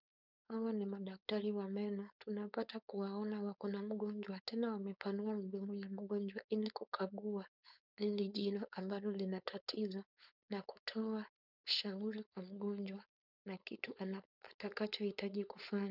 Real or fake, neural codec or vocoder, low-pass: fake; codec, 16 kHz, 4.8 kbps, FACodec; 5.4 kHz